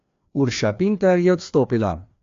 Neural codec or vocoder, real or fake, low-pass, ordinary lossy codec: codec, 16 kHz, 2 kbps, FreqCodec, larger model; fake; 7.2 kHz; AAC, 48 kbps